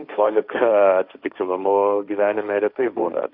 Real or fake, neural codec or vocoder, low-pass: fake; codec, 16 kHz, 1.1 kbps, Voila-Tokenizer; 5.4 kHz